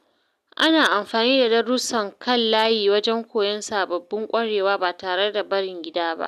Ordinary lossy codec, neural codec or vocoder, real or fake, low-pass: none; none; real; 14.4 kHz